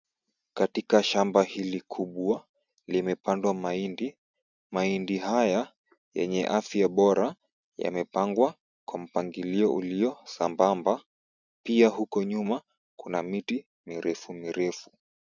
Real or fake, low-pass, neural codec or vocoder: real; 7.2 kHz; none